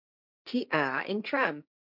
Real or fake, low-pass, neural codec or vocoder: fake; 5.4 kHz; codec, 16 kHz, 1.1 kbps, Voila-Tokenizer